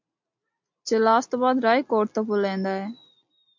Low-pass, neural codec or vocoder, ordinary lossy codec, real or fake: 7.2 kHz; none; MP3, 48 kbps; real